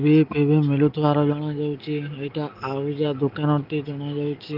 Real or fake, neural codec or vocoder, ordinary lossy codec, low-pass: real; none; Opus, 32 kbps; 5.4 kHz